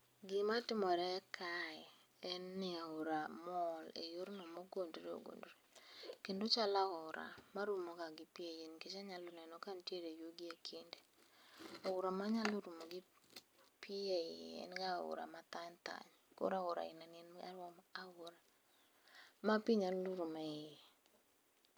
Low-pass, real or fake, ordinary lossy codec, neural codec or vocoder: none; real; none; none